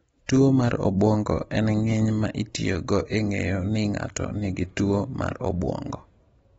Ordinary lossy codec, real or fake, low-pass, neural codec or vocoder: AAC, 24 kbps; real; 19.8 kHz; none